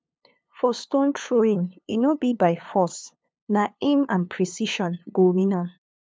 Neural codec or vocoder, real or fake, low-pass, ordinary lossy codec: codec, 16 kHz, 2 kbps, FunCodec, trained on LibriTTS, 25 frames a second; fake; none; none